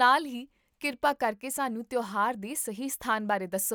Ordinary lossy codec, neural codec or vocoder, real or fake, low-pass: none; none; real; none